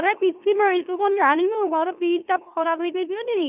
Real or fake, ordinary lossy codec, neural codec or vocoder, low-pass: fake; none; autoencoder, 44.1 kHz, a latent of 192 numbers a frame, MeloTTS; 3.6 kHz